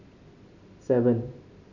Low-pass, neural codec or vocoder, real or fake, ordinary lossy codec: 7.2 kHz; none; real; none